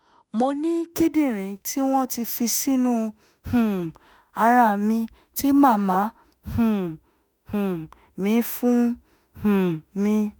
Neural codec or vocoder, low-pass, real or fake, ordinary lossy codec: autoencoder, 48 kHz, 32 numbers a frame, DAC-VAE, trained on Japanese speech; none; fake; none